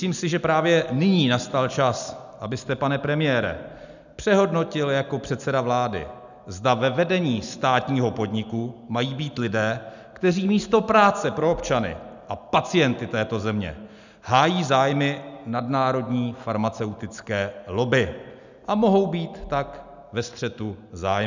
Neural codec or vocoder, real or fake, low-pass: none; real; 7.2 kHz